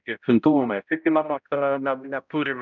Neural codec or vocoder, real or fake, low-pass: codec, 16 kHz, 0.5 kbps, X-Codec, HuBERT features, trained on balanced general audio; fake; 7.2 kHz